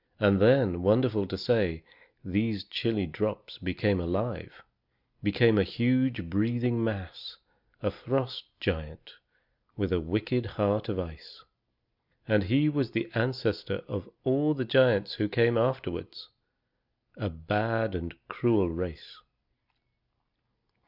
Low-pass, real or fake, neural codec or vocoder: 5.4 kHz; real; none